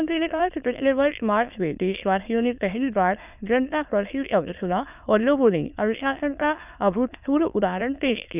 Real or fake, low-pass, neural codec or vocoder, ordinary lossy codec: fake; 3.6 kHz; autoencoder, 22.05 kHz, a latent of 192 numbers a frame, VITS, trained on many speakers; none